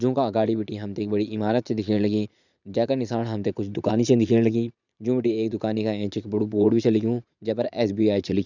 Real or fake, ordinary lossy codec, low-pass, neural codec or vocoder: fake; none; 7.2 kHz; vocoder, 44.1 kHz, 80 mel bands, Vocos